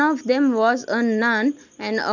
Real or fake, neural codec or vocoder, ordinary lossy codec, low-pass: real; none; none; 7.2 kHz